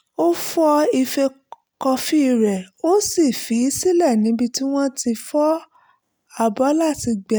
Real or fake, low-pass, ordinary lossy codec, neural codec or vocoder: real; none; none; none